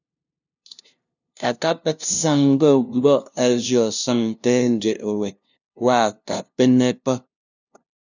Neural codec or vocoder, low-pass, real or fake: codec, 16 kHz, 0.5 kbps, FunCodec, trained on LibriTTS, 25 frames a second; 7.2 kHz; fake